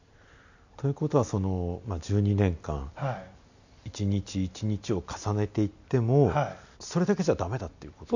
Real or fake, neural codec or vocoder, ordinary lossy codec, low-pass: real; none; none; 7.2 kHz